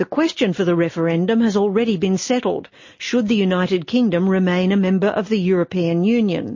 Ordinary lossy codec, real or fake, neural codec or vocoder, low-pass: MP3, 32 kbps; real; none; 7.2 kHz